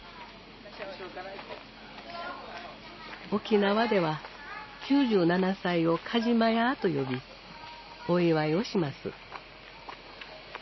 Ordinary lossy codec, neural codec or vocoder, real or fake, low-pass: MP3, 24 kbps; none; real; 7.2 kHz